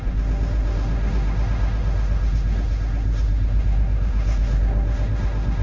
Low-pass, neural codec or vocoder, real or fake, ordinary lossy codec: 7.2 kHz; codec, 16 kHz, 1.1 kbps, Voila-Tokenizer; fake; Opus, 32 kbps